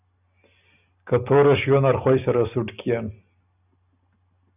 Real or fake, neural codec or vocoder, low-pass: real; none; 3.6 kHz